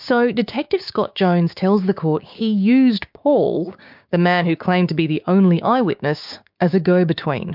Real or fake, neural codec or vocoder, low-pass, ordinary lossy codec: fake; codec, 16 kHz, 6 kbps, DAC; 5.4 kHz; MP3, 48 kbps